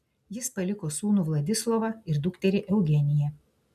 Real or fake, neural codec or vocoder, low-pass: real; none; 14.4 kHz